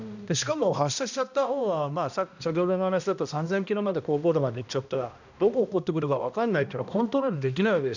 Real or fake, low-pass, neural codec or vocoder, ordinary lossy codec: fake; 7.2 kHz; codec, 16 kHz, 1 kbps, X-Codec, HuBERT features, trained on balanced general audio; none